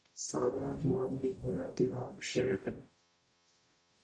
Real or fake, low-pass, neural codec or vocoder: fake; 9.9 kHz; codec, 44.1 kHz, 0.9 kbps, DAC